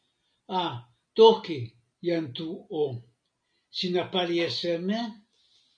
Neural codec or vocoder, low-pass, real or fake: none; 9.9 kHz; real